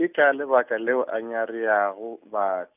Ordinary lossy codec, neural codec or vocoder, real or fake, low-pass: none; none; real; 3.6 kHz